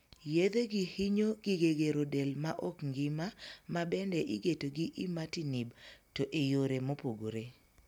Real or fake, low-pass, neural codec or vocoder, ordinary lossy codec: real; 19.8 kHz; none; none